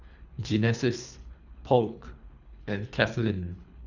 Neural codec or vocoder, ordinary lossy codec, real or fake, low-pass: codec, 24 kHz, 3 kbps, HILCodec; none; fake; 7.2 kHz